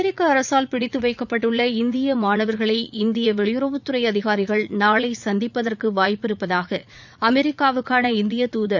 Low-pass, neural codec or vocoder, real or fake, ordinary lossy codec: 7.2 kHz; vocoder, 44.1 kHz, 80 mel bands, Vocos; fake; none